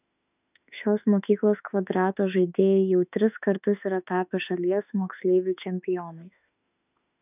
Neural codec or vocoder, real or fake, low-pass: autoencoder, 48 kHz, 32 numbers a frame, DAC-VAE, trained on Japanese speech; fake; 3.6 kHz